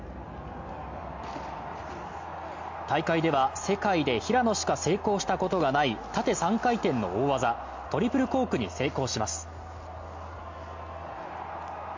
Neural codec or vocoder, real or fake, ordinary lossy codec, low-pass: none; real; MP3, 48 kbps; 7.2 kHz